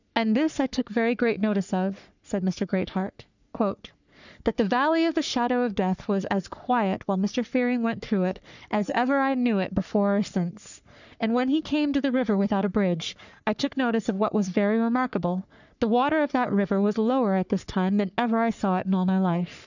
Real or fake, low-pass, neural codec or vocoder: fake; 7.2 kHz; codec, 44.1 kHz, 3.4 kbps, Pupu-Codec